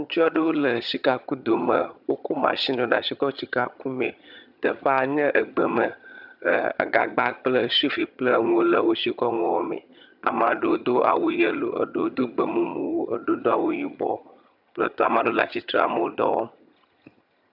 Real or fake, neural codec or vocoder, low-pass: fake; vocoder, 22.05 kHz, 80 mel bands, HiFi-GAN; 5.4 kHz